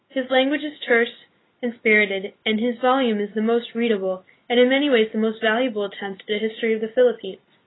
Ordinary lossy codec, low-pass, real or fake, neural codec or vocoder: AAC, 16 kbps; 7.2 kHz; real; none